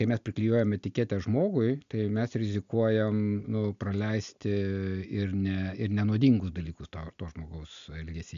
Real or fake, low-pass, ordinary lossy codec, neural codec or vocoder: real; 7.2 kHz; AAC, 64 kbps; none